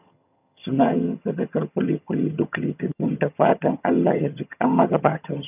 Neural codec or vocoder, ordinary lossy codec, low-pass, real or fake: vocoder, 22.05 kHz, 80 mel bands, HiFi-GAN; none; 3.6 kHz; fake